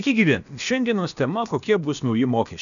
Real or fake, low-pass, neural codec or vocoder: fake; 7.2 kHz; codec, 16 kHz, about 1 kbps, DyCAST, with the encoder's durations